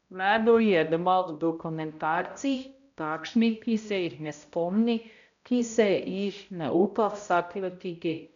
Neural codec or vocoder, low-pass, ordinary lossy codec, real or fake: codec, 16 kHz, 0.5 kbps, X-Codec, HuBERT features, trained on balanced general audio; 7.2 kHz; none; fake